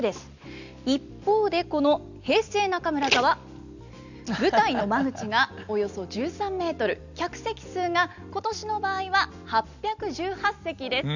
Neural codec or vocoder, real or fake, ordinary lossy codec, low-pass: none; real; none; 7.2 kHz